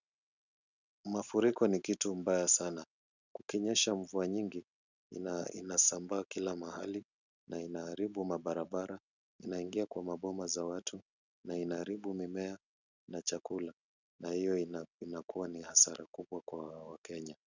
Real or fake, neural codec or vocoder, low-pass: real; none; 7.2 kHz